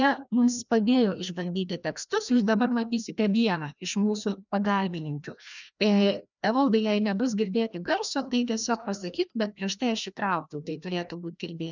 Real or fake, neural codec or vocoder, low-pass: fake; codec, 16 kHz, 1 kbps, FreqCodec, larger model; 7.2 kHz